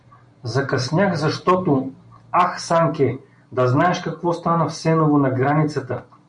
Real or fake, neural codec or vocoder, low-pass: real; none; 9.9 kHz